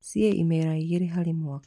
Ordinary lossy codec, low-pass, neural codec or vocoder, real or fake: none; none; none; real